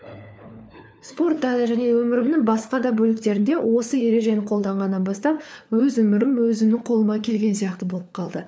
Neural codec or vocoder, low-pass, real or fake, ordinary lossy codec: codec, 16 kHz, 4 kbps, FunCodec, trained on LibriTTS, 50 frames a second; none; fake; none